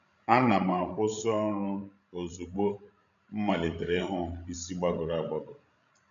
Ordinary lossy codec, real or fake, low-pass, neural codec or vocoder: none; fake; 7.2 kHz; codec, 16 kHz, 16 kbps, FreqCodec, larger model